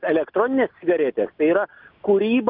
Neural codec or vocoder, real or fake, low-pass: none; real; 5.4 kHz